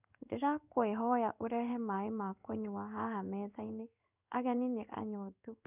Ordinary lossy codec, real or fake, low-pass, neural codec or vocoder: none; fake; 3.6 kHz; codec, 16 kHz in and 24 kHz out, 1 kbps, XY-Tokenizer